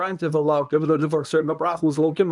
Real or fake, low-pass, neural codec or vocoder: fake; 10.8 kHz; codec, 24 kHz, 0.9 kbps, WavTokenizer, medium speech release version 1